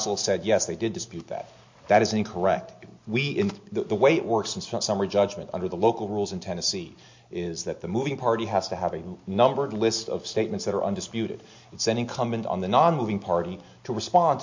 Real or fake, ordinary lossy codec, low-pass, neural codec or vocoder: real; MP3, 48 kbps; 7.2 kHz; none